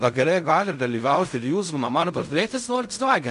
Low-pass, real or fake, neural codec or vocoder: 10.8 kHz; fake; codec, 16 kHz in and 24 kHz out, 0.4 kbps, LongCat-Audio-Codec, fine tuned four codebook decoder